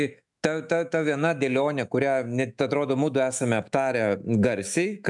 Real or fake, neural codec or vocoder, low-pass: fake; autoencoder, 48 kHz, 128 numbers a frame, DAC-VAE, trained on Japanese speech; 10.8 kHz